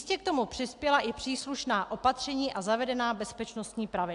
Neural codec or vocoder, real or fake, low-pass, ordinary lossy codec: none; real; 10.8 kHz; AAC, 64 kbps